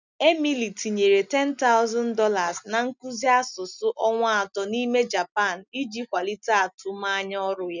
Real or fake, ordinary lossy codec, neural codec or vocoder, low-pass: real; none; none; 7.2 kHz